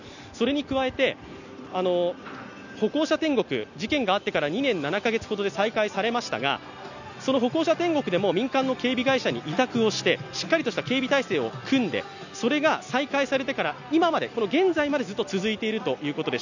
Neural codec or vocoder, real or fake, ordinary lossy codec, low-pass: none; real; none; 7.2 kHz